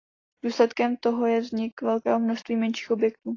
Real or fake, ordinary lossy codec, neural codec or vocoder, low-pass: real; AAC, 32 kbps; none; 7.2 kHz